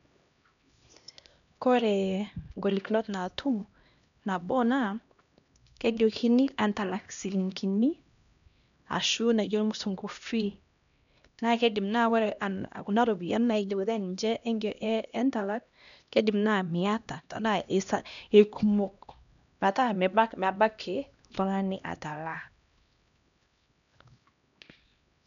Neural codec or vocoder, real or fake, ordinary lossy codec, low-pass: codec, 16 kHz, 1 kbps, X-Codec, HuBERT features, trained on LibriSpeech; fake; none; 7.2 kHz